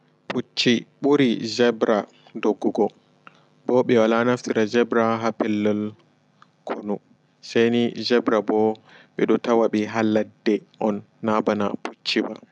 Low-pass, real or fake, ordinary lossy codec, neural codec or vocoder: 10.8 kHz; real; none; none